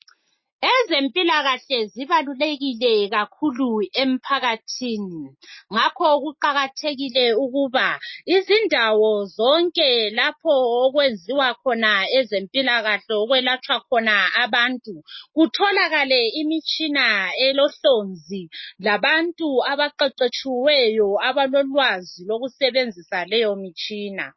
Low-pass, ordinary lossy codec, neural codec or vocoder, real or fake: 7.2 kHz; MP3, 24 kbps; none; real